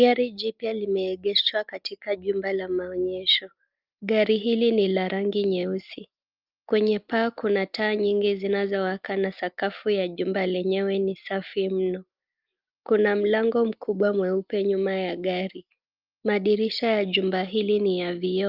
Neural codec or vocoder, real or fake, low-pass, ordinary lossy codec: none; real; 5.4 kHz; Opus, 24 kbps